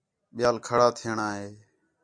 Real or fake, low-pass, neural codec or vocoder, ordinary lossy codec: real; 9.9 kHz; none; MP3, 64 kbps